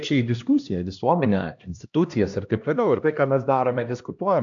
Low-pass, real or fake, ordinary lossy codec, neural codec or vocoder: 7.2 kHz; fake; AAC, 64 kbps; codec, 16 kHz, 1 kbps, X-Codec, HuBERT features, trained on LibriSpeech